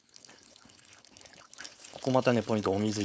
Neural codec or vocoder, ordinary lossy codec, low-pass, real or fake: codec, 16 kHz, 4.8 kbps, FACodec; none; none; fake